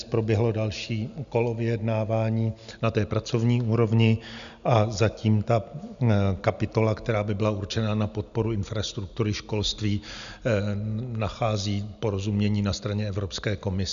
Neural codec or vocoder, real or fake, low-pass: none; real; 7.2 kHz